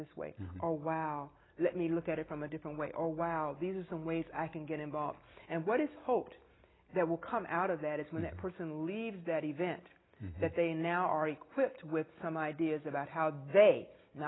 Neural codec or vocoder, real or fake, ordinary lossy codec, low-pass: none; real; AAC, 16 kbps; 7.2 kHz